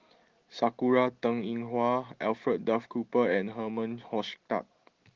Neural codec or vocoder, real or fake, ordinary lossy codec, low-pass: none; real; Opus, 16 kbps; 7.2 kHz